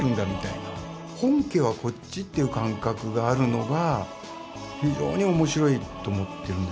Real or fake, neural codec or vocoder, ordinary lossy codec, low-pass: real; none; none; none